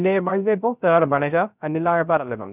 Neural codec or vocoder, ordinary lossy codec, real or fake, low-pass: codec, 16 kHz, 0.3 kbps, FocalCodec; none; fake; 3.6 kHz